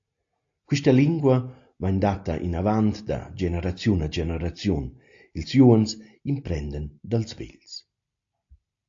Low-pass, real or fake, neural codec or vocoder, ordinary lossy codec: 7.2 kHz; real; none; AAC, 48 kbps